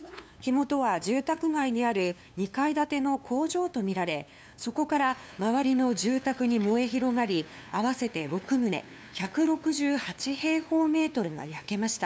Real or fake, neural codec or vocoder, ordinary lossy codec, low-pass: fake; codec, 16 kHz, 2 kbps, FunCodec, trained on LibriTTS, 25 frames a second; none; none